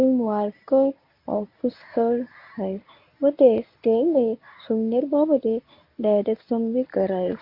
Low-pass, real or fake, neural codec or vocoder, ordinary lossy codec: 5.4 kHz; fake; codec, 24 kHz, 0.9 kbps, WavTokenizer, medium speech release version 1; MP3, 32 kbps